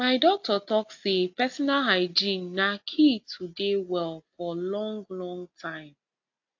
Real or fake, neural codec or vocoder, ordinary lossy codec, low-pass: real; none; AAC, 48 kbps; 7.2 kHz